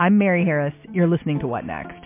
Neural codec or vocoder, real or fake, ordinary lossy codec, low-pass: none; real; MP3, 24 kbps; 3.6 kHz